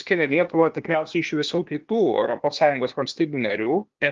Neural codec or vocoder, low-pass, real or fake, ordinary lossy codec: codec, 16 kHz, 0.8 kbps, ZipCodec; 7.2 kHz; fake; Opus, 32 kbps